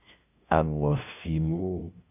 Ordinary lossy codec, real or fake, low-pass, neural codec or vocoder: AAC, 32 kbps; fake; 3.6 kHz; codec, 16 kHz, 0.5 kbps, FunCodec, trained on LibriTTS, 25 frames a second